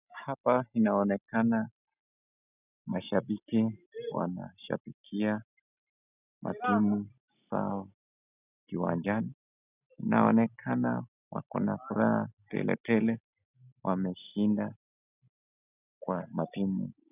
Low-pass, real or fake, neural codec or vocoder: 3.6 kHz; real; none